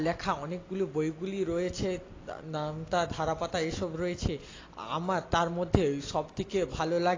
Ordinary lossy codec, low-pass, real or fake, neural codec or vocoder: AAC, 32 kbps; 7.2 kHz; real; none